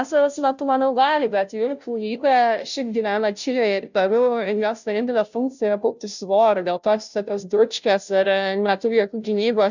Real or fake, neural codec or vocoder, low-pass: fake; codec, 16 kHz, 0.5 kbps, FunCodec, trained on Chinese and English, 25 frames a second; 7.2 kHz